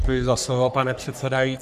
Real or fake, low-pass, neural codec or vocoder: fake; 14.4 kHz; codec, 44.1 kHz, 3.4 kbps, Pupu-Codec